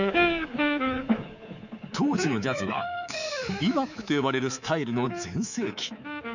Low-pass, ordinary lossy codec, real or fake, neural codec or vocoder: 7.2 kHz; none; fake; codec, 24 kHz, 3.1 kbps, DualCodec